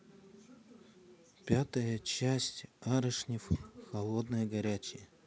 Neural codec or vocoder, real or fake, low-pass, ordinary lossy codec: none; real; none; none